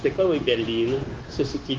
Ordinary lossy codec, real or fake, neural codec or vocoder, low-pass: Opus, 16 kbps; fake; codec, 16 kHz, 0.9 kbps, LongCat-Audio-Codec; 7.2 kHz